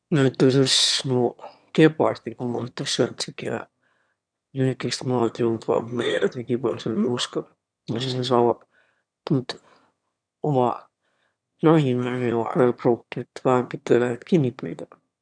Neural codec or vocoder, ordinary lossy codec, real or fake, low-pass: autoencoder, 22.05 kHz, a latent of 192 numbers a frame, VITS, trained on one speaker; none; fake; 9.9 kHz